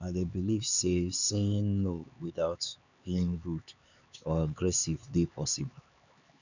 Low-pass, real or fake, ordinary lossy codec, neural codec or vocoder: 7.2 kHz; fake; none; codec, 16 kHz, 4 kbps, X-Codec, HuBERT features, trained on LibriSpeech